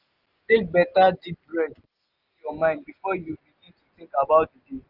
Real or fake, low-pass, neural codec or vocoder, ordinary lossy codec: real; 5.4 kHz; none; Opus, 32 kbps